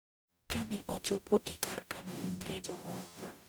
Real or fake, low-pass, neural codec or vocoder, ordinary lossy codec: fake; none; codec, 44.1 kHz, 0.9 kbps, DAC; none